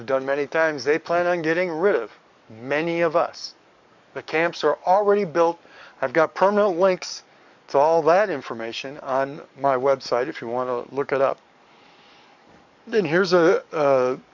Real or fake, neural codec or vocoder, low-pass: fake; codec, 44.1 kHz, 7.8 kbps, DAC; 7.2 kHz